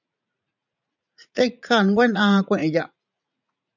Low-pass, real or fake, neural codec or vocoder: 7.2 kHz; fake; vocoder, 44.1 kHz, 80 mel bands, Vocos